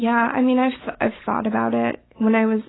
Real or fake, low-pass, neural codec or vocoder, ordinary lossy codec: real; 7.2 kHz; none; AAC, 16 kbps